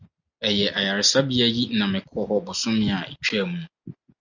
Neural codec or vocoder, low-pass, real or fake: none; 7.2 kHz; real